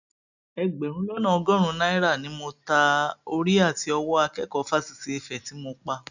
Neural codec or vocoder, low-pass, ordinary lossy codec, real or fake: none; 7.2 kHz; none; real